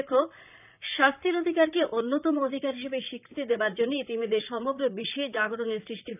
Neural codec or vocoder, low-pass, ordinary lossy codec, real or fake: vocoder, 44.1 kHz, 128 mel bands, Pupu-Vocoder; 3.6 kHz; none; fake